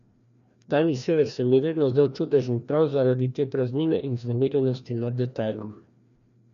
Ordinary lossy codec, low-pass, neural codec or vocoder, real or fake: none; 7.2 kHz; codec, 16 kHz, 1 kbps, FreqCodec, larger model; fake